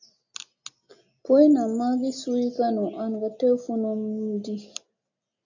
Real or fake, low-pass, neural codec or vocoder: real; 7.2 kHz; none